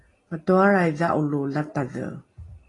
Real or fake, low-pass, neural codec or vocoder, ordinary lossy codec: real; 10.8 kHz; none; AAC, 32 kbps